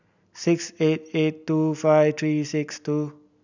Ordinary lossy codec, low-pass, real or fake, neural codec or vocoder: none; 7.2 kHz; real; none